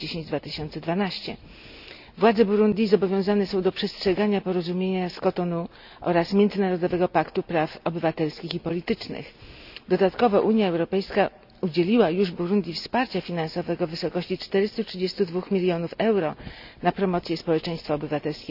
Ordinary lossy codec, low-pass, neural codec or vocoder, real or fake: none; 5.4 kHz; none; real